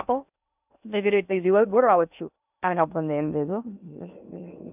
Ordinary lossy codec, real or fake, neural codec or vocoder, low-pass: none; fake; codec, 16 kHz in and 24 kHz out, 0.6 kbps, FocalCodec, streaming, 2048 codes; 3.6 kHz